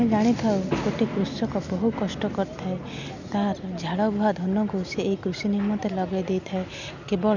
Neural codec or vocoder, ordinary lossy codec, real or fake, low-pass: none; none; real; 7.2 kHz